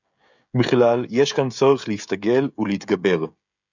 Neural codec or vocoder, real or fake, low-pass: codec, 16 kHz, 16 kbps, FreqCodec, smaller model; fake; 7.2 kHz